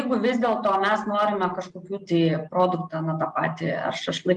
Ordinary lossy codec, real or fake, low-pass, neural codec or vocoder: Opus, 32 kbps; real; 9.9 kHz; none